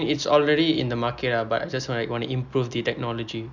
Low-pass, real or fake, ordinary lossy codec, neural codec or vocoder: 7.2 kHz; real; none; none